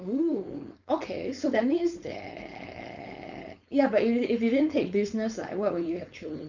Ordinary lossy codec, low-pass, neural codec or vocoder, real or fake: none; 7.2 kHz; codec, 16 kHz, 4.8 kbps, FACodec; fake